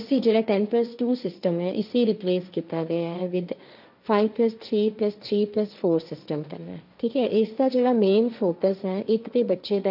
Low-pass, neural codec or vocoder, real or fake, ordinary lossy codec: 5.4 kHz; codec, 16 kHz, 1.1 kbps, Voila-Tokenizer; fake; none